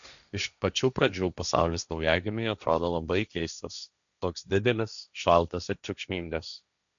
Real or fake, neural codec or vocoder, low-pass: fake; codec, 16 kHz, 1.1 kbps, Voila-Tokenizer; 7.2 kHz